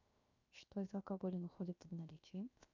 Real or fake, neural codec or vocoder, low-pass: fake; codec, 16 kHz, 0.7 kbps, FocalCodec; 7.2 kHz